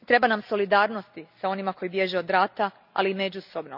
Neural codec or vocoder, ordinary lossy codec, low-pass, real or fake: none; none; 5.4 kHz; real